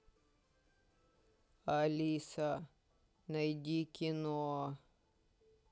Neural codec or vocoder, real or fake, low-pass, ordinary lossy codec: none; real; none; none